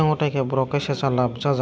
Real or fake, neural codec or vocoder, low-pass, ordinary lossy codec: real; none; none; none